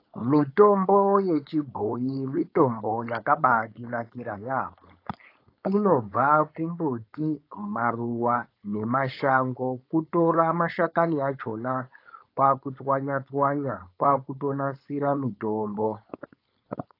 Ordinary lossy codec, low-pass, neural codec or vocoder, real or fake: AAC, 32 kbps; 5.4 kHz; codec, 16 kHz, 4.8 kbps, FACodec; fake